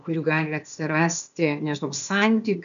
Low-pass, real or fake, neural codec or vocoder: 7.2 kHz; fake; codec, 16 kHz, 0.8 kbps, ZipCodec